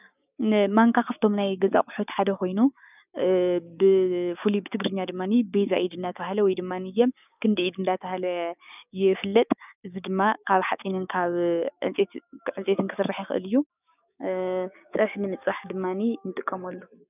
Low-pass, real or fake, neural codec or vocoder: 3.6 kHz; fake; codec, 16 kHz, 6 kbps, DAC